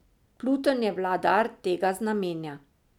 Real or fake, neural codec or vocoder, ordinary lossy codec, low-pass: real; none; none; 19.8 kHz